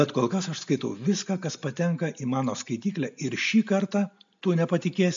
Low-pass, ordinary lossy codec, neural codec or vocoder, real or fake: 7.2 kHz; AAC, 64 kbps; none; real